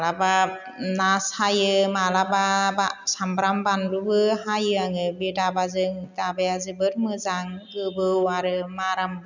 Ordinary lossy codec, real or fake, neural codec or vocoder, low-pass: none; real; none; 7.2 kHz